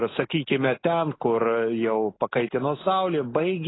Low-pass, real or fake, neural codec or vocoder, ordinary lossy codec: 7.2 kHz; real; none; AAC, 16 kbps